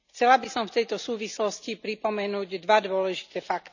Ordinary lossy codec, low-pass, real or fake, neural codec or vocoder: none; 7.2 kHz; real; none